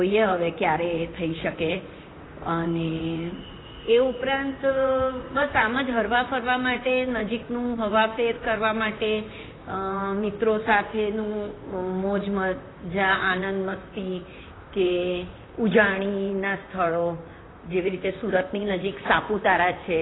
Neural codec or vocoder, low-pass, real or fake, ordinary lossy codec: vocoder, 44.1 kHz, 128 mel bands, Pupu-Vocoder; 7.2 kHz; fake; AAC, 16 kbps